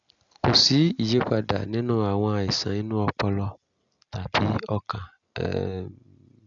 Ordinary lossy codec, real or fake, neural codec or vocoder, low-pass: none; real; none; 7.2 kHz